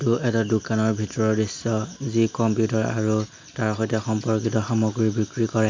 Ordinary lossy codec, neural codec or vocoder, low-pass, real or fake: MP3, 64 kbps; none; 7.2 kHz; real